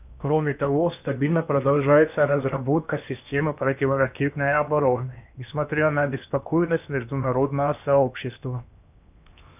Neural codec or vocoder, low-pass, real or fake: codec, 16 kHz in and 24 kHz out, 0.8 kbps, FocalCodec, streaming, 65536 codes; 3.6 kHz; fake